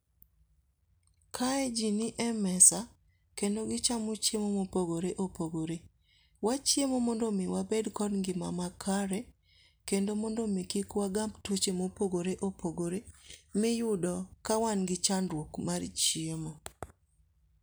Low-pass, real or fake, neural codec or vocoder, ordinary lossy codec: none; real; none; none